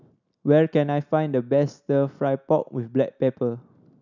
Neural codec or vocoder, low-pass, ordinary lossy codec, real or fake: none; 7.2 kHz; none; real